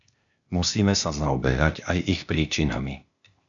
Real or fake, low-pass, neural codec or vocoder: fake; 7.2 kHz; codec, 16 kHz, 0.8 kbps, ZipCodec